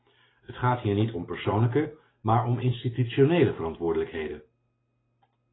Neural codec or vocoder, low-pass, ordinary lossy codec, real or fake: none; 7.2 kHz; AAC, 16 kbps; real